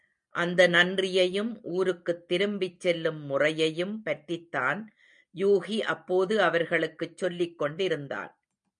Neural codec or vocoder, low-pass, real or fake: none; 9.9 kHz; real